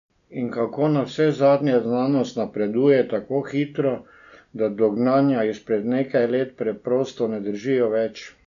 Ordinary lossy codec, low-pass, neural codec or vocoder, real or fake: none; 7.2 kHz; none; real